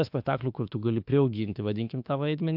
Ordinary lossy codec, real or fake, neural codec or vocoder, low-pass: AAC, 48 kbps; fake; autoencoder, 48 kHz, 32 numbers a frame, DAC-VAE, trained on Japanese speech; 5.4 kHz